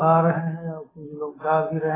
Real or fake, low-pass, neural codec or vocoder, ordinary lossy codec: real; 3.6 kHz; none; AAC, 16 kbps